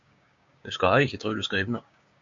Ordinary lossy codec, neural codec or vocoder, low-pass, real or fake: MP3, 64 kbps; codec, 16 kHz, 6 kbps, DAC; 7.2 kHz; fake